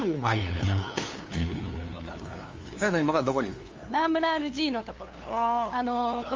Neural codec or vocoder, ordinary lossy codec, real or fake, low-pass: codec, 16 kHz, 2 kbps, FunCodec, trained on LibriTTS, 25 frames a second; Opus, 32 kbps; fake; 7.2 kHz